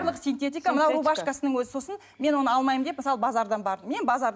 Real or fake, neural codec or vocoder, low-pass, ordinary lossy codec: real; none; none; none